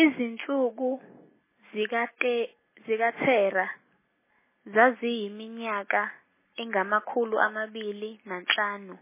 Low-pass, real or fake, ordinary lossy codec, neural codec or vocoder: 3.6 kHz; real; MP3, 16 kbps; none